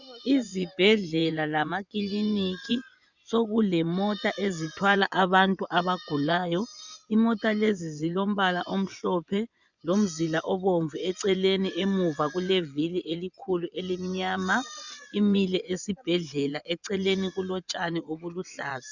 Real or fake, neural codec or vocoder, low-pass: fake; vocoder, 24 kHz, 100 mel bands, Vocos; 7.2 kHz